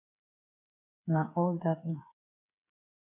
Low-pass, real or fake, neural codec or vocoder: 3.6 kHz; fake; codec, 16 kHz, 8 kbps, FreqCodec, smaller model